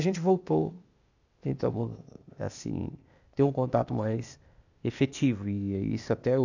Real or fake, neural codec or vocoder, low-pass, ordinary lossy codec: fake; codec, 16 kHz, 0.8 kbps, ZipCodec; 7.2 kHz; none